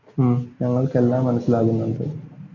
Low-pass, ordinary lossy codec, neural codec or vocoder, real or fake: 7.2 kHz; MP3, 48 kbps; none; real